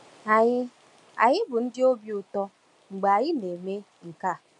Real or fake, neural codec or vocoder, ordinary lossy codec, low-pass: real; none; none; 10.8 kHz